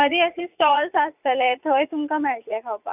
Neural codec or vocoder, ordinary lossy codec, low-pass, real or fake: vocoder, 44.1 kHz, 80 mel bands, Vocos; none; 3.6 kHz; fake